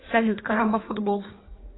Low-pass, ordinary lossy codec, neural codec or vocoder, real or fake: 7.2 kHz; AAC, 16 kbps; codec, 16 kHz in and 24 kHz out, 1.1 kbps, FireRedTTS-2 codec; fake